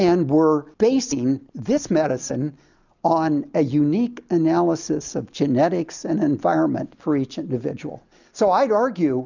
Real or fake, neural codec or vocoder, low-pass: real; none; 7.2 kHz